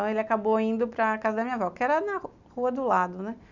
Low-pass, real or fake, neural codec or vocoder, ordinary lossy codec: 7.2 kHz; real; none; none